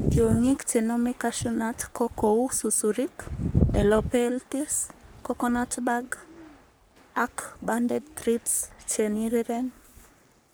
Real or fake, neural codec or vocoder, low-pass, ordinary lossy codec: fake; codec, 44.1 kHz, 3.4 kbps, Pupu-Codec; none; none